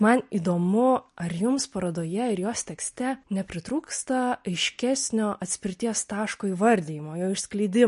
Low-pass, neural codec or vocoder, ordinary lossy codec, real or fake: 10.8 kHz; none; MP3, 48 kbps; real